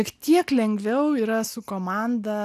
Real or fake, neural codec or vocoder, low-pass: real; none; 14.4 kHz